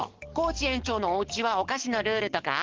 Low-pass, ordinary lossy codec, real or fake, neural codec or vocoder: 7.2 kHz; Opus, 16 kbps; fake; codec, 44.1 kHz, 7.8 kbps, DAC